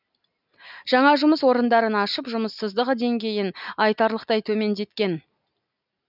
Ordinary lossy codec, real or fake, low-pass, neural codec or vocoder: none; real; 5.4 kHz; none